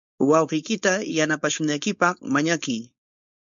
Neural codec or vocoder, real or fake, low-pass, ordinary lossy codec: codec, 16 kHz, 4.8 kbps, FACodec; fake; 7.2 kHz; MP3, 64 kbps